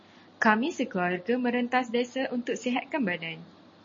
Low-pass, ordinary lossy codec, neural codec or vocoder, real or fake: 7.2 kHz; MP3, 32 kbps; none; real